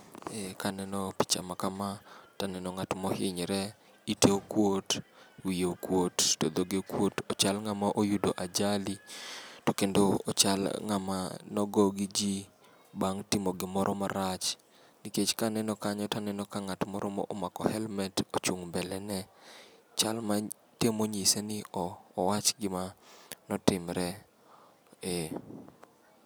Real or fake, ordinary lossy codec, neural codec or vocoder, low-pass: real; none; none; none